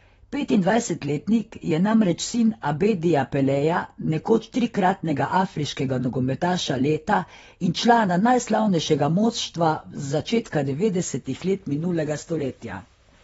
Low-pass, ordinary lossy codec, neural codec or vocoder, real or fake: 19.8 kHz; AAC, 24 kbps; vocoder, 44.1 kHz, 128 mel bands, Pupu-Vocoder; fake